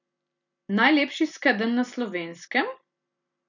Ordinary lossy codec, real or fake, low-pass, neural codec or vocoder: none; real; 7.2 kHz; none